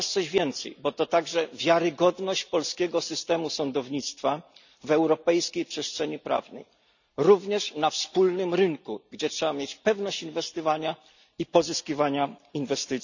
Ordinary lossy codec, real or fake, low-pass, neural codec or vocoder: none; real; 7.2 kHz; none